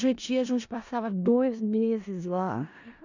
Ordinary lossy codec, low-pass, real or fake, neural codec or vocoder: none; 7.2 kHz; fake; codec, 16 kHz in and 24 kHz out, 0.4 kbps, LongCat-Audio-Codec, four codebook decoder